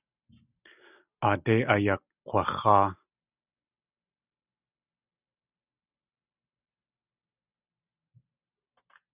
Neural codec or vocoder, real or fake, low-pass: none; real; 3.6 kHz